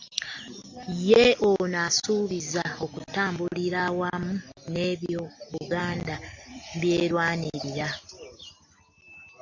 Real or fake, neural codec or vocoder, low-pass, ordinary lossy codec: real; none; 7.2 kHz; AAC, 48 kbps